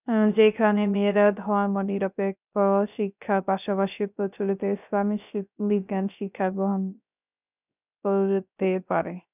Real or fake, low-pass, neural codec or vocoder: fake; 3.6 kHz; codec, 16 kHz, 0.3 kbps, FocalCodec